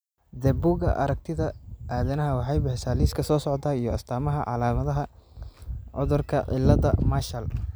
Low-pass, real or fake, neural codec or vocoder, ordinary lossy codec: none; real; none; none